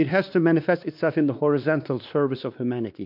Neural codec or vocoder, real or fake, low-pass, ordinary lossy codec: codec, 16 kHz, 2 kbps, X-Codec, WavLM features, trained on Multilingual LibriSpeech; fake; 5.4 kHz; MP3, 48 kbps